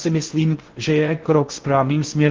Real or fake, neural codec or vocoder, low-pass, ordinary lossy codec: fake; codec, 16 kHz in and 24 kHz out, 0.6 kbps, FocalCodec, streaming, 4096 codes; 7.2 kHz; Opus, 16 kbps